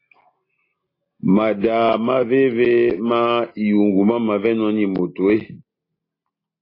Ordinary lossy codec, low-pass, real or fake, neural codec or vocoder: AAC, 24 kbps; 5.4 kHz; real; none